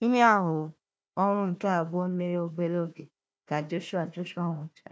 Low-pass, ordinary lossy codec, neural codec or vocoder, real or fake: none; none; codec, 16 kHz, 1 kbps, FunCodec, trained on Chinese and English, 50 frames a second; fake